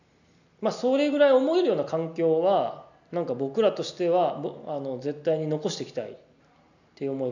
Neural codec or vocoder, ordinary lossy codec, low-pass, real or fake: none; none; 7.2 kHz; real